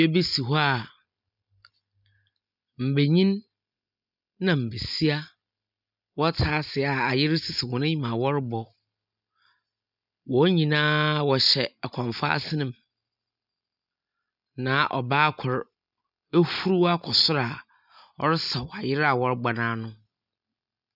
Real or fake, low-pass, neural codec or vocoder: real; 5.4 kHz; none